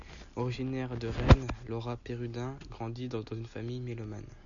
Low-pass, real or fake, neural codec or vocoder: 7.2 kHz; real; none